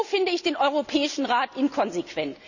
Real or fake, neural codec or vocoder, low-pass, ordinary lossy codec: real; none; 7.2 kHz; none